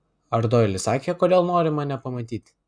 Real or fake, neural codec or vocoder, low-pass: real; none; 9.9 kHz